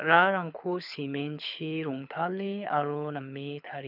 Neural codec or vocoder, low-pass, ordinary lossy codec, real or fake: codec, 24 kHz, 6 kbps, HILCodec; 5.4 kHz; none; fake